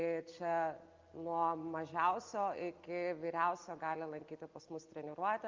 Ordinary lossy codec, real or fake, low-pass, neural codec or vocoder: Opus, 24 kbps; real; 7.2 kHz; none